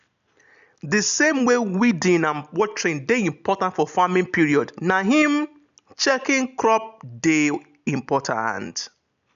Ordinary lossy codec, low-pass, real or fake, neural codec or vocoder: none; 7.2 kHz; real; none